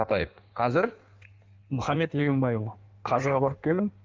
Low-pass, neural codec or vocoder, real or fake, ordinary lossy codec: 7.2 kHz; codec, 16 kHz in and 24 kHz out, 1.1 kbps, FireRedTTS-2 codec; fake; Opus, 32 kbps